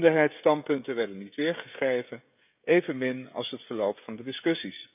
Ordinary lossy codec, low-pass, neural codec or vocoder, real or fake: none; 3.6 kHz; codec, 16 kHz, 16 kbps, FreqCodec, smaller model; fake